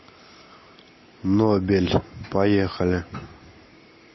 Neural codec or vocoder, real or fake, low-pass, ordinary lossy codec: none; real; 7.2 kHz; MP3, 24 kbps